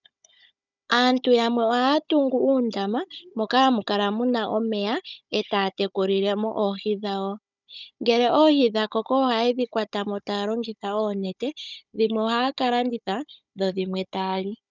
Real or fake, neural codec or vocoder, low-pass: fake; codec, 16 kHz, 16 kbps, FunCodec, trained on Chinese and English, 50 frames a second; 7.2 kHz